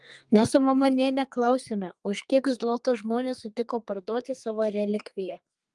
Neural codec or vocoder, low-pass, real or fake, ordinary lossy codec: codec, 32 kHz, 1.9 kbps, SNAC; 10.8 kHz; fake; Opus, 32 kbps